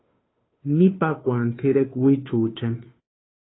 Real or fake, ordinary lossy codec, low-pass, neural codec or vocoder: fake; AAC, 16 kbps; 7.2 kHz; codec, 16 kHz, 2 kbps, FunCodec, trained on Chinese and English, 25 frames a second